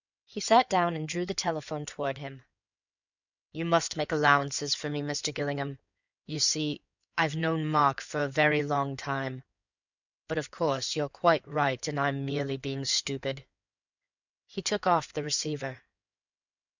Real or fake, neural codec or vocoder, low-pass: fake; codec, 16 kHz in and 24 kHz out, 2.2 kbps, FireRedTTS-2 codec; 7.2 kHz